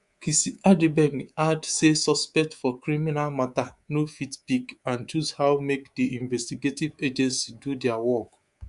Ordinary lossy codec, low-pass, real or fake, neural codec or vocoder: Opus, 64 kbps; 10.8 kHz; fake; codec, 24 kHz, 3.1 kbps, DualCodec